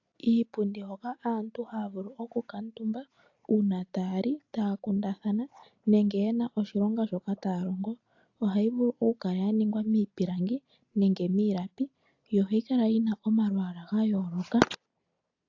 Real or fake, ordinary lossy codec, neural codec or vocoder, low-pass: real; AAC, 48 kbps; none; 7.2 kHz